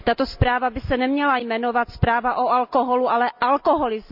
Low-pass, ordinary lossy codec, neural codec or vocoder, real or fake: 5.4 kHz; none; none; real